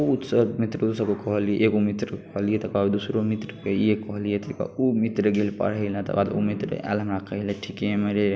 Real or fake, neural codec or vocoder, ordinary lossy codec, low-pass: real; none; none; none